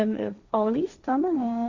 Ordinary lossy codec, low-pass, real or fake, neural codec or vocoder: none; 7.2 kHz; fake; codec, 16 kHz, 1.1 kbps, Voila-Tokenizer